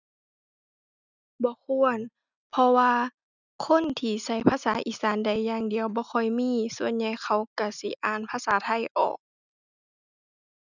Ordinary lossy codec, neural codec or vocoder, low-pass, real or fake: none; none; 7.2 kHz; real